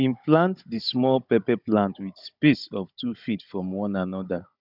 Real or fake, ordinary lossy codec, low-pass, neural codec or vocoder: fake; none; 5.4 kHz; codec, 16 kHz, 16 kbps, FunCodec, trained on Chinese and English, 50 frames a second